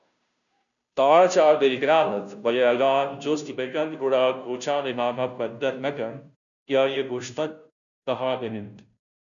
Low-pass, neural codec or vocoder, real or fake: 7.2 kHz; codec, 16 kHz, 0.5 kbps, FunCodec, trained on Chinese and English, 25 frames a second; fake